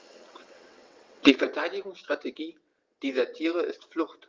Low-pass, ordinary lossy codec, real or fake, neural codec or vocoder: 7.2 kHz; Opus, 24 kbps; fake; vocoder, 22.05 kHz, 80 mel bands, WaveNeXt